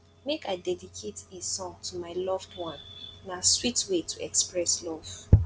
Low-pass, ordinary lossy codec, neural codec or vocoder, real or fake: none; none; none; real